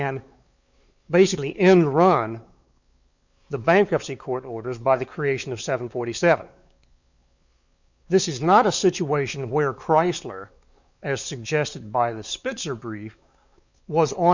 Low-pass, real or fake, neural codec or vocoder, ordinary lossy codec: 7.2 kHz; fake; codec, 16 kHz, 4 kbps, X-Codec, WavLM features, trained on Multilingual LibriSpeech; Opus, 64 kbps